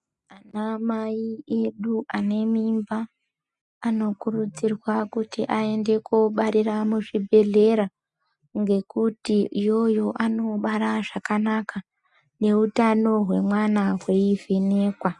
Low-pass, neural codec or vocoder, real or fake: 10.8 kHz; none; real